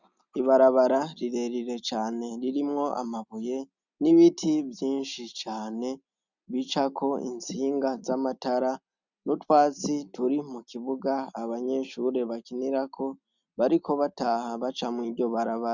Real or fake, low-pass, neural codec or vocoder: real; 7.2 kHz; none